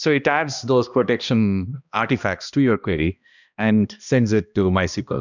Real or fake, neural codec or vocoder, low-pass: fake; codec, 16 kHz, 1 kbps, X-Codec, HuBERT features, trained on balanced general audio; 7.2 kHz